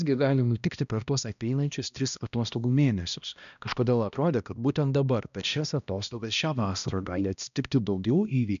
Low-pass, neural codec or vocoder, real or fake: 7.2 kHz; codec, 16 kHz, 1 kbps, X-Codec, HuBERT features, trained on balanced general audio; fake